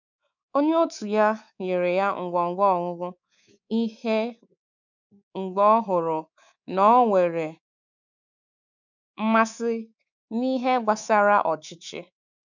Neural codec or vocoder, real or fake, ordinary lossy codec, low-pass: codec, 24 kHz, 3.1 kbps, DualCodec; fake; none; 7.2 kHz